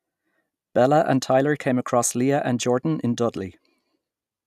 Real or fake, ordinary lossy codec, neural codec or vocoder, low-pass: real; none; none; 14.4 kHz